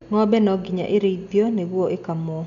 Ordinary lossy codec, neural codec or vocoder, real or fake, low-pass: none; none; real; 7.2 kHz